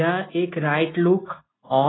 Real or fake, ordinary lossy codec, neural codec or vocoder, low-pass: real; AAC, 16 kbps; none; 7.2 kHz